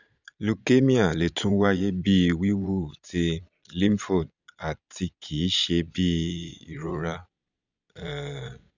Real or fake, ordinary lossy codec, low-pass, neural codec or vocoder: fake; none; 7.2 kHz; vocoder, 22.05 kHz, 80 mel bands, Vocos